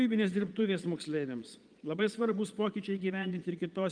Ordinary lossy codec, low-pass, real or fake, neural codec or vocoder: Opus, 32 kbps; 9.9 kHz; fake; vocoder, 22.05 kHz, 80 mel bands, Vocos